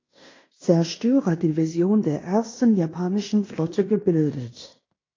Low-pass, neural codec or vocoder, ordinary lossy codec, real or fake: 7.2 kHz; codec, 16 kHz in and 24 kHz out, 0.9 kbps, LongCat-Audio-Codec, fine tuned four codebook decoder; AAC, 32 kbps; fake